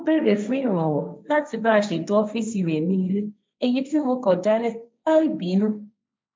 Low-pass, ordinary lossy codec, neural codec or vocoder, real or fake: none; none; codec, 16 kHz, 1.1 kbps, Voila-Tokenizer; fake